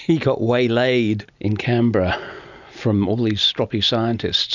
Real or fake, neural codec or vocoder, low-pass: real; none; 7.2 kHz